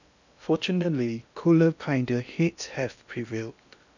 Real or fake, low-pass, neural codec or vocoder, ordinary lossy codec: fake; 7.2 kHz; codec, 16 kHz in and 24 kHz out, 0.8 kbps, FocalCodec, streaming, 65536 codes; none